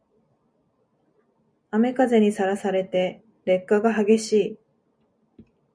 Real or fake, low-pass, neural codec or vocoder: real; 9.9 kHz; none